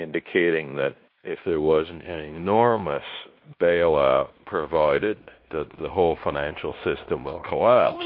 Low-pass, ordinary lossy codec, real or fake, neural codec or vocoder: 5.4 kHz; MP3, 32 kbps; fake; codec, 16 kHz in and 24 kHz out, 0.9 kbps, LongCat-Audio-Codec, fine tuned four codebook decoder